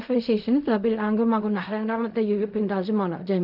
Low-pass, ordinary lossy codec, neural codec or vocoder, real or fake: 5.4 kHz; none; codec, 16 kHz in and 24 kHz out, 0.4 kbps, LongCat-Audio-Codec, fine tuned four codebook decoder; fake